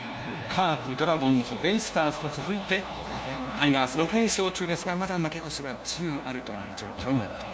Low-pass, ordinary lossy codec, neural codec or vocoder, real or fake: none; none; codec, 16 kHz, 1 kbps, FunCodec, trained on LibriTTS, 50 frames a second; fake